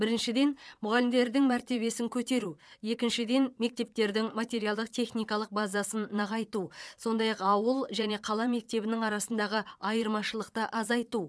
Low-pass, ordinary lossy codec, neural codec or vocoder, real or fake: none; none; vocoder, 22.05 kHz, 80 mel bands, Vocos; fake